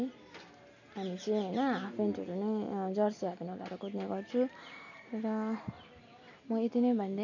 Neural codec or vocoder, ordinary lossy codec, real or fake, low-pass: none; none; real; 7.2 kHz